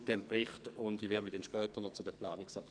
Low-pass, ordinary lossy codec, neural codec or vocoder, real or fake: 9.9 kHz; none; codec, 44.1 kHz, 2.6 kbps, SNAC; fake